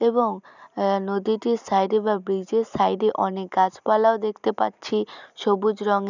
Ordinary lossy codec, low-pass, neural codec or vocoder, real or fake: none; 7.2 kHz; none; real